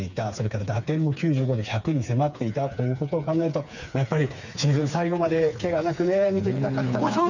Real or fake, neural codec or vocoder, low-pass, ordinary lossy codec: fake; codec, 16 kHz, 4 kbps, FreqCodec, smaller model; 7.2 kHz; none